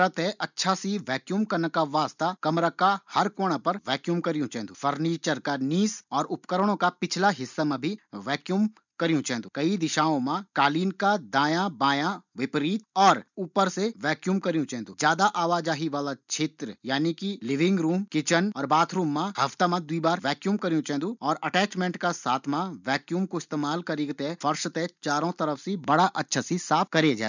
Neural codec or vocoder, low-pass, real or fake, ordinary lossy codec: none; 7.2 kHz; real; none